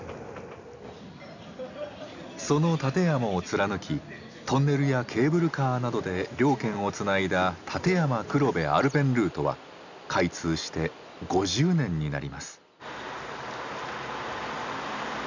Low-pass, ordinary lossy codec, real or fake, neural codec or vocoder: 7.2 kHz; none; real; none